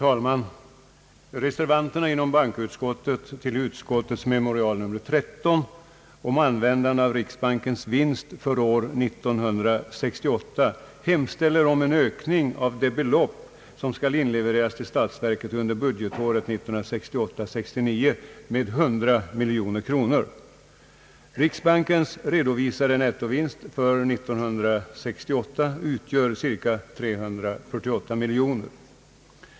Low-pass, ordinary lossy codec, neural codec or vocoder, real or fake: none; none; none; real